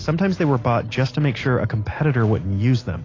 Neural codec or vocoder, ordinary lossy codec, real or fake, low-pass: none; AAC, 32 kbps; real; 7.2 kHz